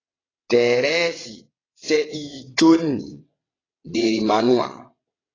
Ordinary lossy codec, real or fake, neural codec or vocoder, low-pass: AAC, 32 kbps; fake; vocoder, 22.05 kHz, 80 mel bands, WaveNeXt; 7.2 kHz